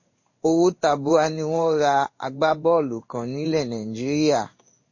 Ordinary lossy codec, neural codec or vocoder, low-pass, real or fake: MP3, 32 kbps; codec, 16 kHz in and 24 kHz out, 1 kbps, XY-Tokenizer; 7.2 kHz; fake